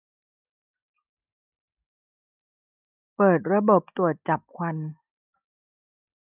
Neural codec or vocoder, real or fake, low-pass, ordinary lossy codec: none; real; 3.6 kHz; none